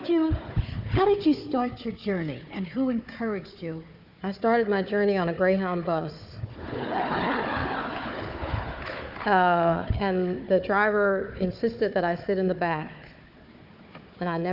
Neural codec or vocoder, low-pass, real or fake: codec, 16 kHz, 4 kbps, FunCodec, trained on Chinese and English, 50 frames a second; 5.4 kHz; fake